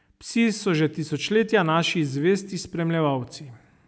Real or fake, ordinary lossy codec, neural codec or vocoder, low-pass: real; none; none; none